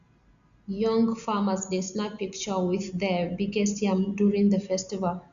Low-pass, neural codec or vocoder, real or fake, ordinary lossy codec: 7.2 kHz; none; real; Opus, 64 kbps